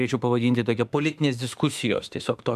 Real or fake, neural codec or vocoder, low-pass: fake; autoencoder, 48 kHz, 32 numbers a frame, DAC-VAE, trained on Japanese speech; 14.4 kHz